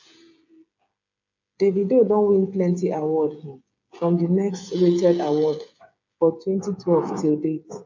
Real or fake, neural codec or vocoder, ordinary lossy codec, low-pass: fake; codec, 16 kHz, 8 kbps, FreqCodec, smaller model; MP3, 64 kbps; 7.2 kHz